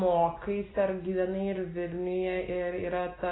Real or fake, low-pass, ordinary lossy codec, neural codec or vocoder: real; 7.2 kHz; AAC, 16 kbps; none